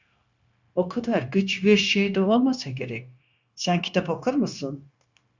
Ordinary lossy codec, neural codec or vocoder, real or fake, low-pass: Opus, 64 kbps; codec, 16 kHz, 0.9 kbps, LongCat-Audio-Codec; fake; 7.2 kHz